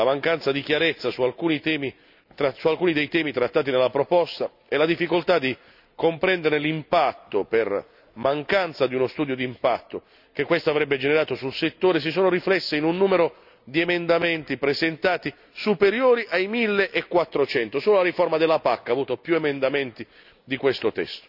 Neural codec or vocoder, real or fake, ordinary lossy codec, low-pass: none; real; none; 5.4 kHz